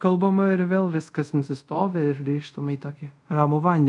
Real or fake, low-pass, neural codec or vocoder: fake; 10.8 kHz; codec, 24 kHz, 0.5 kbps, DualCodec